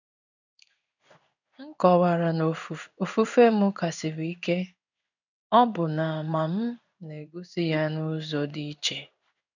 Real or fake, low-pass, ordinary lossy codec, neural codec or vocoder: fake; 7.2 kHz; none; codec, 16 kHz in and 24 kHz out, 1 kbps, XY-Tokenizer